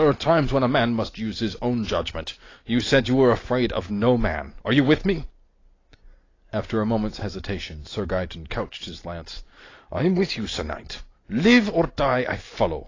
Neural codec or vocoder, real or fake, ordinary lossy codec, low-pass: none; real; AAC, 32 kbps; 7.2 kHz